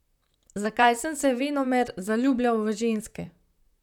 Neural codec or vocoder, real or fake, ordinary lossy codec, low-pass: vocoder, 44.1 kHz, 128 mel bands, Pupu-Vocoder; fake; none; 19.8 kHz